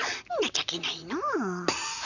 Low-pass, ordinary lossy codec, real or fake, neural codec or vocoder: 7.2 kHz; none; fake; vocoder, 44.1 kHz, 128 mel bands, Pupu-Vocoder